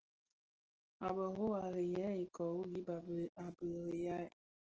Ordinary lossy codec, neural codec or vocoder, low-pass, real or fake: Opus, 16 kbps; none; 7.2 kHz; real